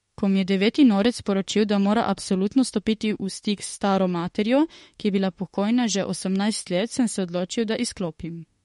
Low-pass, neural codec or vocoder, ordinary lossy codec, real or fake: 19.8 kHz; autoencoder, 48 kHz, 32 numbers a frame, DAC-VAE, trained on Japanese speech; MP3, 48 kbps; fake